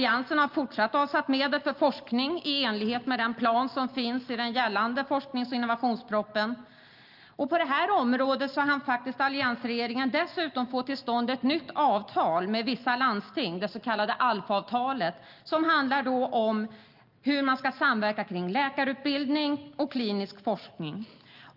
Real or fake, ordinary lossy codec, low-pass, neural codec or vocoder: real; Opus, 24 kbps; 5.4 kHz; none